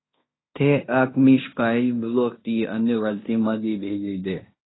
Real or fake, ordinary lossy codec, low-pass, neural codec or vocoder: fake; AAC, 16 kbps; 7.2 kHz; codec, 16 kHz in and 24 kHz out, 0.9 kbps, LongCat-Audio-Codec, fine tuned four codebook decoder